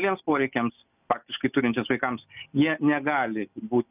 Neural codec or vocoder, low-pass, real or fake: none; 3.6 kHz; real